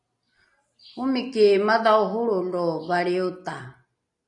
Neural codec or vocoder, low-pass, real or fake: none; 10.8 kHz; real